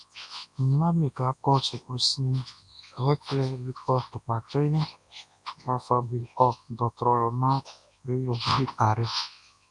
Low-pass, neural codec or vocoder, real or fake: 10.8 kHz; codec, 24 kHz, 0.9 kbps, WavTokenizer, large speech release; fake